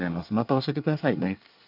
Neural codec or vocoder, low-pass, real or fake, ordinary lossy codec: codec, 24 kHz, 1 kbps, SNAC; 5.4 kHz; fake; none